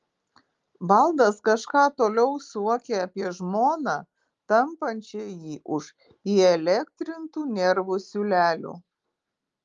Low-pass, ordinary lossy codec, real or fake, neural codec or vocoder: 7.2 kHz; Opus, 32 kbps; real; none